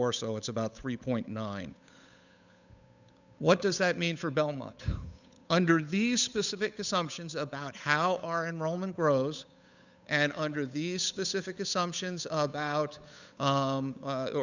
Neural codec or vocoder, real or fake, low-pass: codec, 16 kHz, 8 kbps, FunCodec, trained on Chinese and English, 25 frames a second; fake; 7.2 kHz